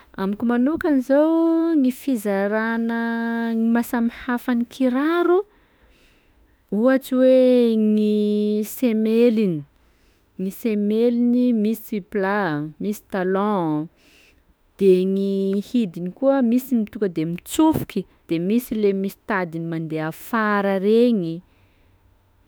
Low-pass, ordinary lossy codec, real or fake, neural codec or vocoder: none; none; fake; autoencoder, 48 kHz, 32 numbers a frame, DAC-VAE, trained on Japanese speech